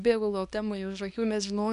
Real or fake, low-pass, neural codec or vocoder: fake; 10.8 kHz; codec, 24 kHz, 0.9 kbps, WavTokenizer, small release